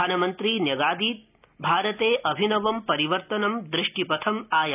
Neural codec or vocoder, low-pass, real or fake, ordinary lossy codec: none; 3.6 kHz; real; none